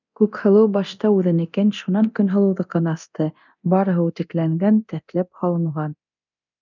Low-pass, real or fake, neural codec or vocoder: 7.2 kHz; fake; codec, 24 kHz, 0.9 kbps, DualCodec